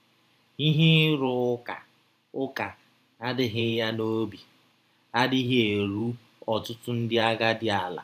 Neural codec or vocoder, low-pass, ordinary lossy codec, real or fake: vocoder, 44.1 kHz, 128 mel bands every 512 samples, BigVGAN v2; 14.4 kHz; none; fake